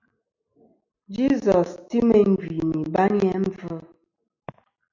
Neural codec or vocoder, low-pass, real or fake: none; 7.2 kHz; real